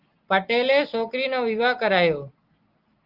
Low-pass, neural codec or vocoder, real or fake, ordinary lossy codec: 5.4 kHz; none; real; Opus, 32 kbps